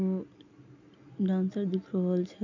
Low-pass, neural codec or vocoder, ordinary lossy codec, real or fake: 7.2 kHz; none; none; real